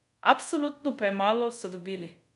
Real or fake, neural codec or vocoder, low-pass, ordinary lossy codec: fake; codec, 24 kHz, 0.5 kbps, DualCodec; 10.8 kHz; none